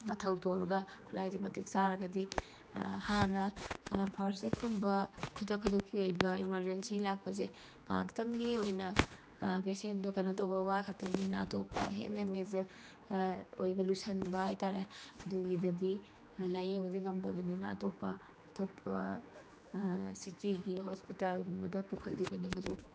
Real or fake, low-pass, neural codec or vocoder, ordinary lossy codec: fake; none; codec, 16 kHz, 2 kbps, X-Codec, HuBERT features, trained on general audio; none